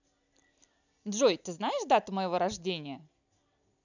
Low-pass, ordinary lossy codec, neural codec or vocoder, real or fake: 7.2 kHz; none; none; real